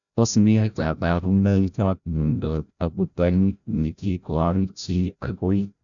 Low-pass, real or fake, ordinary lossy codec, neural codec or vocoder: 7.2 kHz; fake; none; codec, 16 kHz, 0.5 kbps, FreqCodec, larger model